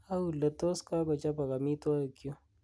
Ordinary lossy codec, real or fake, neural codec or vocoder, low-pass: none; real; none; none